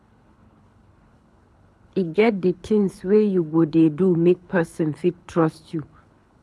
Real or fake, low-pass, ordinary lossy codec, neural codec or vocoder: fake; none; none; codec, 24 kHz, 6 kbps, HILCodec